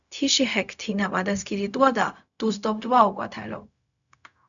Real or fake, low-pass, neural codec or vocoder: fake; 7.2 kHz; codec, 16 kHz, 0.4 kbps, LongCat-Audio-Codec